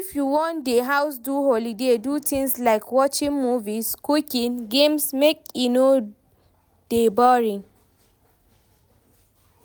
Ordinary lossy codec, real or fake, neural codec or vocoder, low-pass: none; real; none; none